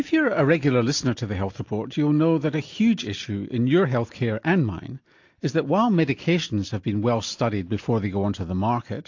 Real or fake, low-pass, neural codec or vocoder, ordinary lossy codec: real; 7.2 kHz; none; AAC, 48 kbps